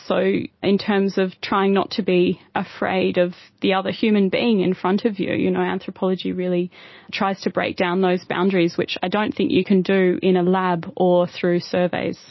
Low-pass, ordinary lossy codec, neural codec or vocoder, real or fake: 7.2 kHz; MP3, 24 kbps; none; real